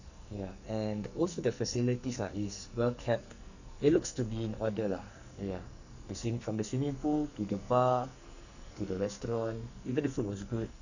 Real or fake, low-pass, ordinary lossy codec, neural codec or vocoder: fake; 7.2 kHz; none; codec, 44.1 kHz, 2.6 kbps, SNAC